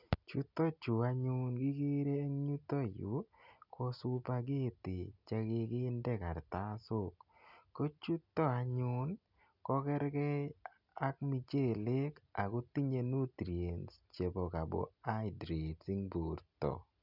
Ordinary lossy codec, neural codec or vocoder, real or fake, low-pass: none; none; real; 5.4 kHz